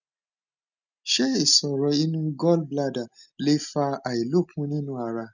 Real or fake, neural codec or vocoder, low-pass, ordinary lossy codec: real; none; 7.2 kHz; none